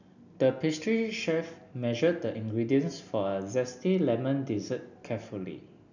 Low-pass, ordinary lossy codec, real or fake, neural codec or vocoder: 7.2 kHz; none; real; none